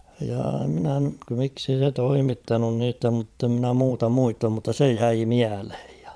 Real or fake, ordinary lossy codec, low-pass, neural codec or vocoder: fake; none; none; vocoder, 22.05 kHz, 80 mel bands, Vocos